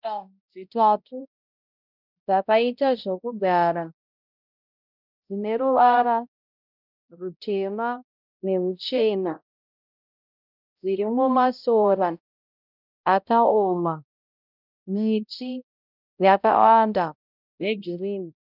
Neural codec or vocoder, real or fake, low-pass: codec, 16 kHz, 0.5 kbps, X-Codec, HuBERT features, trained on balanced general audio; fake; 5.4 kHz